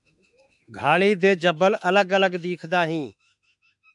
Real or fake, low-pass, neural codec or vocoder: fake; 10.8 kHz; autoencoder, 48 kHz, 32 numbers a frame, DAC-VAE, trained on Japanese speech